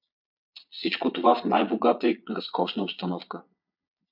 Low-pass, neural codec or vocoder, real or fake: 5.4 kHz; vocoder, 22.05 kHz, 80 mel bands, Vocos; fake